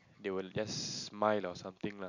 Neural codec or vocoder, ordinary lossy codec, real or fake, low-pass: none; none; real; 7.2 kHz